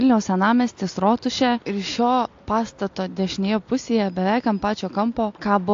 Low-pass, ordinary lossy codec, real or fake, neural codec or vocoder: 7.2 kHz; AAC, 48 kbps; real; none